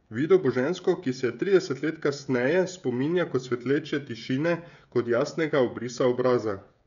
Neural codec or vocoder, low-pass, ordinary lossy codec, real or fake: codec, 16 kHz, 16 kbps, FreqCodec, smaller model; 7.2 kHz; none; fake